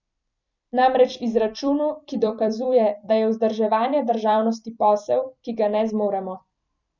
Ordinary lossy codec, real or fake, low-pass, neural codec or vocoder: none; fake; 7.2 kHz; vocoder, 44.1 kHz, 128 mel bands every 256 samples, BigVGAN v2